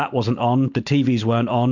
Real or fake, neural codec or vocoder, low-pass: real; none; 7.2 kHz